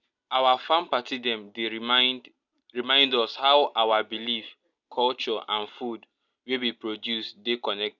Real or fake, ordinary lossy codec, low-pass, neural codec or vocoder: real; none; 7.2 kHz; none